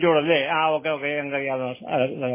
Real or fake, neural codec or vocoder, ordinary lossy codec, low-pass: real; none; MP3, 16 kbps; 3.6 kHz